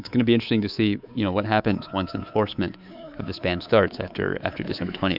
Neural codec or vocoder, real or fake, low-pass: codec, 24 kHz, 3.1 kbps, DualCodec; fake; 5.4 kHz